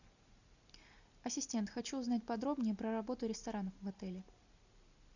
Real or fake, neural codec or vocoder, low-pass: real; none; 7.2 kHz